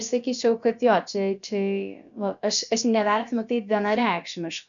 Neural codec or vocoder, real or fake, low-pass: codec, 16 kHz, about 1 kbps, DyCAST, with the encoder's durations; fake; 7.2 kHz